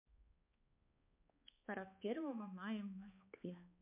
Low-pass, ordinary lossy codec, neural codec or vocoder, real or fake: 3.6 kHz; MP3, 32 kbps; codec, 16 kHz, 2 kbps, X-Codec, HuBERT features, trained on balanced general audio; fake